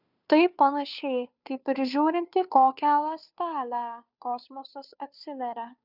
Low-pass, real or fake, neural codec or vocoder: 5.4 kHz; fake; codec, 16 kHz, 2 kbps, FunCodec, trained on Chinese and English, 25 frames a second